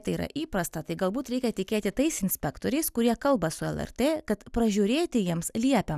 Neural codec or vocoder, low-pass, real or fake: none; 14.4 kHz; real